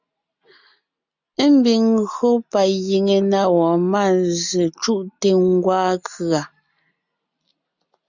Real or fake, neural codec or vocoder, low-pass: real; none; 7.2 kHz